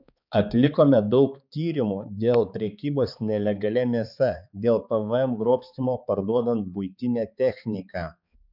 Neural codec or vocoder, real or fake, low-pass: codec, 16 kHz, 4 kbps, X-Codec, HuBERT features, trained on balanced general audio; fake; 5.4 kHz